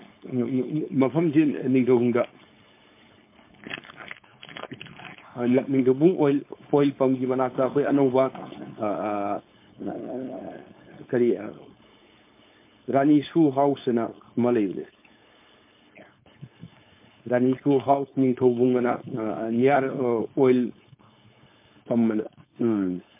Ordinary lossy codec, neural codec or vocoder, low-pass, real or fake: MP3, 24 kbps; codec, 16 kHz, 4.8 kbps, FACodec; 3.6 kHz; fake